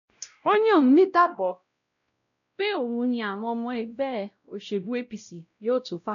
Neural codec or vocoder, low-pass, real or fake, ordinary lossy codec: codec, 16 kHz, 0.5 kbps, X-Codec, WavLM features, trained on Multilingual LibriSpeech; 7.2 kHz; fake; none